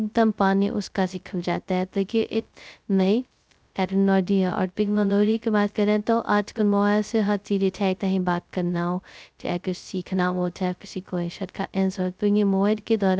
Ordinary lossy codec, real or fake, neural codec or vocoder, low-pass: none; fake; codec, 16 kHz, 0.2 kbps, FocalCodec; none